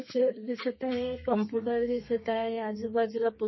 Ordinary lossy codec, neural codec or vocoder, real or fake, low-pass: MP3, 24 kbps; codec, 32 kHz, 1.9 kbps, SNAC; fake; 7.2 kHz